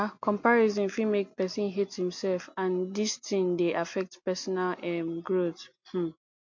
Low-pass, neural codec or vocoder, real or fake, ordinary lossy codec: 7.2 kHz; none; real; MP3, 48 kbps